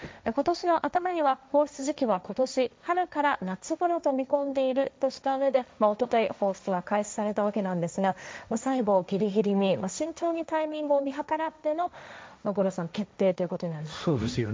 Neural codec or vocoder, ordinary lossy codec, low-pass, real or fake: codec, 16 kHz, 1.1 kbps, Voila-Tokenizer; none; none; fake